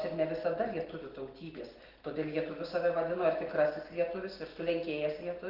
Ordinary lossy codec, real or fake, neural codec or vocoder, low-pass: Opus, 16 kbps; real; none; 5.4 kHz